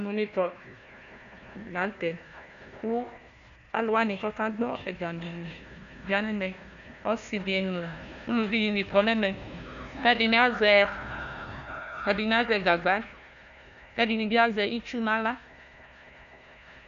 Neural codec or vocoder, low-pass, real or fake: codec, 16 kHz, 1 kbps, FunCodec, trained on Chinese and English, 50 frames a second; 7.2 kHz; fake